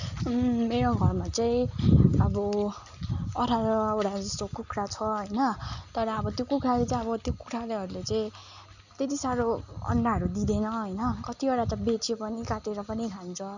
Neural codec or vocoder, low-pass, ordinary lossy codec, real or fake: none; 7.2 kHz; none; real